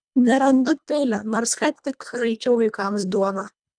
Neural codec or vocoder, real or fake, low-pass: codec, 24 kHz, 1.5 kbps, HILCodec; fake; 9.9 kHz